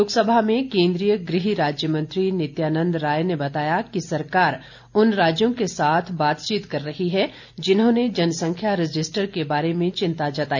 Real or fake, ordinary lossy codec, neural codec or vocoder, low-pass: real; none; none; 7.2 kHz